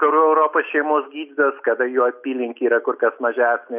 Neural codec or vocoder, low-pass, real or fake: none; 3.6 kHz; real